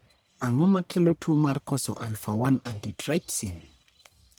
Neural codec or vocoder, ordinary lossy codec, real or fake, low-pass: codec, 44.1 kHz, 1.7 kbps, Pupu-Codec; none; fake; none